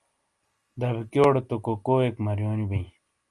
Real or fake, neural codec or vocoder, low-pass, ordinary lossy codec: real; none; 10.8 kHz; Opus, 32 kbps